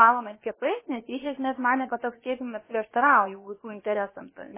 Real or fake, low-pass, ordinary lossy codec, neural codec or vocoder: fake; 3.6 kHz; MP3, 16 kbps; codec, 16 kHz, about 1 kbps, DyCAST, with the encoder's durations